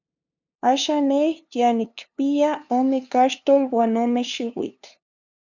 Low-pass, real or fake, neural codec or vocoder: 7.2 kHz; fake; codec, 16 kHz, 2 kbps, FunCodec, trained on LibriTTS, 25 frames a second